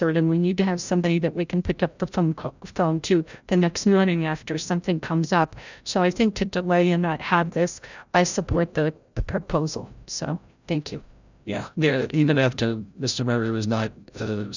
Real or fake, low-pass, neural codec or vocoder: fake; 7.2 kHz; codec, 16 kHz, 0.5 kbps, FreqCodec, larger model